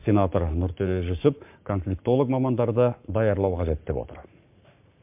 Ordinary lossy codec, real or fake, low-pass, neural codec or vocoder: MP3, 32 kbps; fake; 3.6 kHz; codec, 44.1 kHz, 7.8 kbps, Pupu-Codec